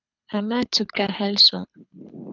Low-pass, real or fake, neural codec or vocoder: 7.2 kHz; fake; codec, 24 kHz, 6 kbps, HILCodec